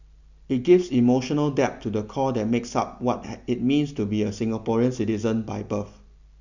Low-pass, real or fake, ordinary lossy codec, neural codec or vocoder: 7.2 kHz; real; none; none